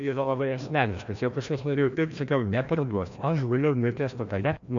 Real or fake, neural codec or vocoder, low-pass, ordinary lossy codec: fake; codec, 16 kHz, 1 kbps, FreqCodec, larger model; 7.2 kHz; AAC, 64 kbps